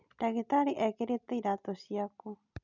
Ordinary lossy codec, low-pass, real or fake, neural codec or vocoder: none; 7.2 kHz; real; none